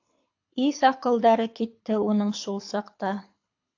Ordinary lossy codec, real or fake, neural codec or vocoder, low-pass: AAC, 48 kbps; fake; codec, 24 kHz, 6 kbps, HILCodec; 7.2 kHz